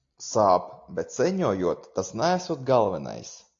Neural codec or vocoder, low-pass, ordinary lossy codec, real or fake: none; 7.2 kHz; AAC, 48 kbps; real